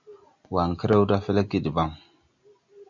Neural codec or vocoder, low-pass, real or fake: none; 7.2 kHz; real